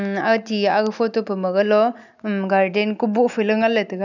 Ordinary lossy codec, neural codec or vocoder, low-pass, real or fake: none; none; 7.2 kHz; real